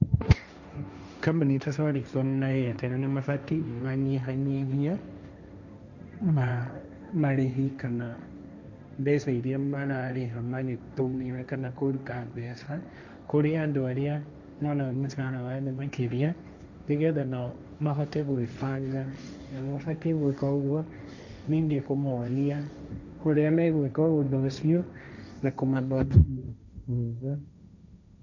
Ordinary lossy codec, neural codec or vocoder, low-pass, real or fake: none; codec, 16 kHz, 1.1 kbps, Voila-Tokenizer; 7.2 kHz; fake